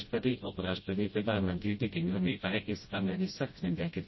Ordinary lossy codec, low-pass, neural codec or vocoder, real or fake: MP3, 24 kbps; 7.2 kHz; codec, 16 kHz, 0.5 kbps, FreqCodec, smaller model; fake